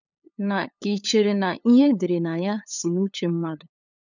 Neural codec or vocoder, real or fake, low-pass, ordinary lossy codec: codec, 16 kHz, 8 kbps, FunCodec, trained on LibriTTS, 25 frames a second; fake; 7.2 kHz; none